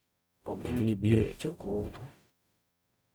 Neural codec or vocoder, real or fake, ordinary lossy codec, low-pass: codec, 44.1 kHz, 0.9 kbps, DAC; fake; none; none